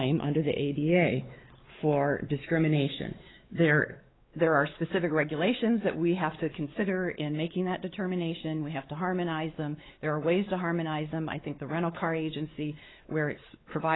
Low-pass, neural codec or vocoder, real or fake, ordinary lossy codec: 7.2 kHz; codec, 16 kHz, 4 kbps, X-Codec, WavLM features, trained on Multilingual LibriSpeech; fake; AAC, 16 kbps